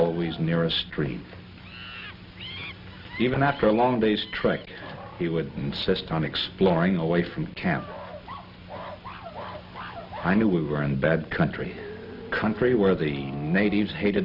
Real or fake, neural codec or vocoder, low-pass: real; none; 5.4 kHz